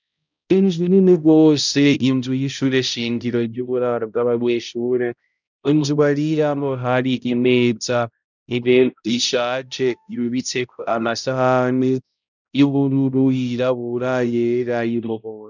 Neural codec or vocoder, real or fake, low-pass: codec, 16 kHz, 0.5 kbps, X-Codec, HuBERT features, trained on balanced general audio; fake; 7.2 kHz